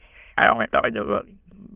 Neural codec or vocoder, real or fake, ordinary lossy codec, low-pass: autoencoder, 22.05 kHz, a latent of 192 numbers a frame, VITS, trained on many speakers; fake; Opus, 24 kbps; 3.6 kHz